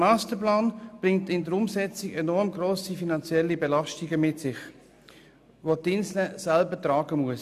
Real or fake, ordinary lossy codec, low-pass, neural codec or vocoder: real; none; 14.4 kHz; none